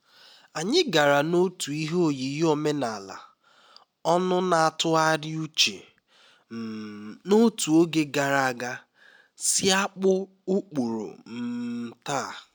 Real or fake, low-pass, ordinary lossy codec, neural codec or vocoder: real; none; none; none